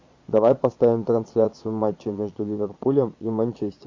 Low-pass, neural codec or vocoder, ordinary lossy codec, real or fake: 7.2 kHz; vocoder, 22.05 kHz, 80 mel bands, Vocos; MP3, 48 kbps; fake